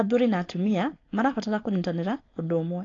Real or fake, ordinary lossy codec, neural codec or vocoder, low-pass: fake; AAC, 32 kbps; codec, 16 kHz, 4.8 kbps, FACodec; 7.2 kHz